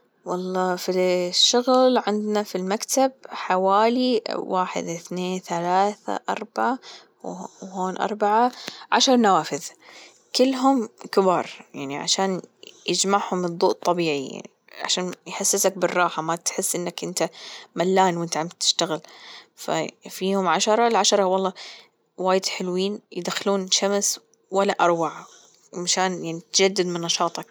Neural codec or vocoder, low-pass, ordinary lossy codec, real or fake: none; none; none; real